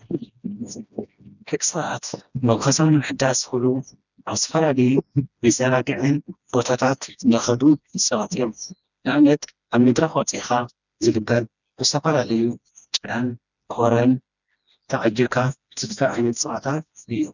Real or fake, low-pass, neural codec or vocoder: fake; 7.2 kHz; codec, 16 kHz, 1 kbps, FreqCodec, smaller model